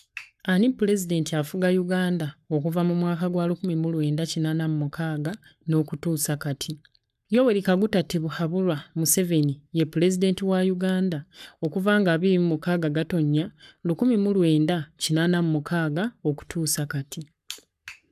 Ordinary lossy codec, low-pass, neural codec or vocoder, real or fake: none; 14.4 kHz; codec, 44.1 kHz, 7.8 kbps, Pupu-Codec; fake